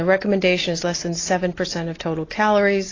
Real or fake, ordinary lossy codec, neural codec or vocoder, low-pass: real; AAC, 32 kbps; none; 7.2 kHz